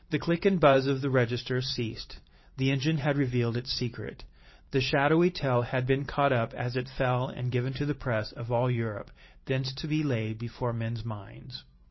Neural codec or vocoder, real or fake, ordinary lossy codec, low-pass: vocoder, 44.1 kHz, 128 mel bands every 512 samples, BigVGAN v2; fake; MP3, 24 kbps; 7.2 kHz